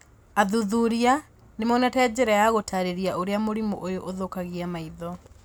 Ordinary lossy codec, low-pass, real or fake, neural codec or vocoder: none; none; real; none